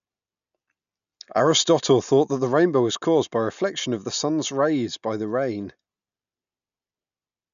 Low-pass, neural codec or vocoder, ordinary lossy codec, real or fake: 7.2 kHz; none; none; real